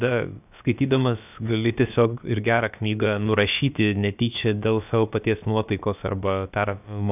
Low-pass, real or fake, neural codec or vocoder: 3.6 kHz; fake; codec, 16 kHz, about 1 kbps, DyCAST, with the encoder's durations